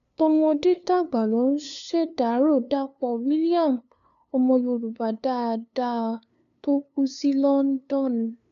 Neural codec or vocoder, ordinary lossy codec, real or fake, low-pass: codec, 16 kHz, 2 kbps, FunCodec, trained on LibriTTS, 25 frames a second; none; fake; 7.2 kHz